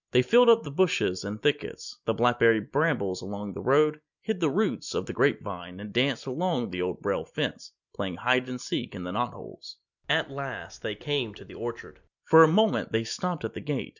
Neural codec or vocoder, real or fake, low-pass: none; real; 7.2 kHz